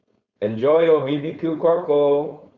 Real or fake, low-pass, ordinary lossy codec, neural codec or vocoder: fake; 7.2 kHz; Opus, 64 kbps; codec, 16 kHz, 4.8 kbps, FACodec